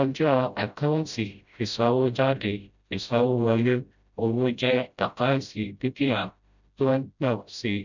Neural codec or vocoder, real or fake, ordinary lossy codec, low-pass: codec, 16 kHz, 0.5 kbps, FreqCodec, smaller model; fake; none; 7.2 kHz